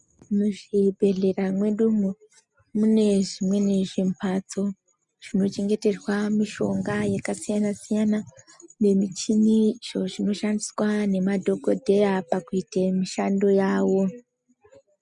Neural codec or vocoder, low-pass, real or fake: none; 10.8 kHz; real